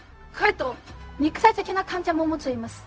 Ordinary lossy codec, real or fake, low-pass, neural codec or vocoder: none; fake; none; codec, 16 kHz, 0.4 kbps, LongCat-Audio-Codec